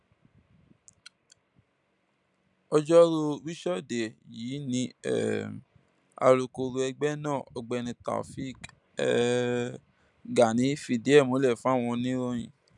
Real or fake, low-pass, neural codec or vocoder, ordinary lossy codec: real; 10.8 kHz; none; none